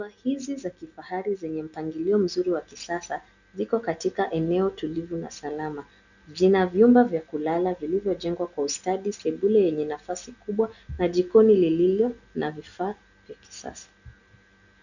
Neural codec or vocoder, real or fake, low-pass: none; real; 7.2 kHz